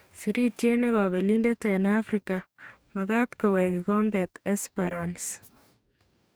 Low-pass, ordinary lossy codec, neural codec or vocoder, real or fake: none; none; codec, 44.1 kHz, 2.6 kbps, DAC; fake